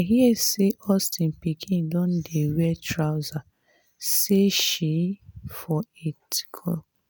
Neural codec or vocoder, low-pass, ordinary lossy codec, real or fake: none; none; none; real